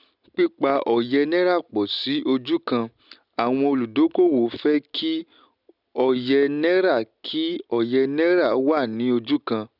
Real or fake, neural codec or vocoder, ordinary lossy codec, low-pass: real; none; none; 5.4 kHz